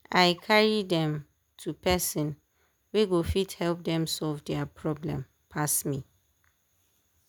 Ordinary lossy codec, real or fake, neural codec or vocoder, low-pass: none; real; none; none